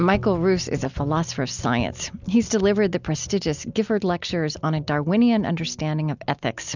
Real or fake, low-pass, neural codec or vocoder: real; 7.2 kHz; none